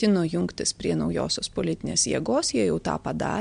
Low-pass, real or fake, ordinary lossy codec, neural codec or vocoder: 9.9 kHz; real; MP3, 64 kbps; none